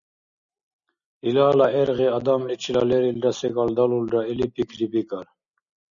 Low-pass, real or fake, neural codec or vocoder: 7.2 kHz; real; none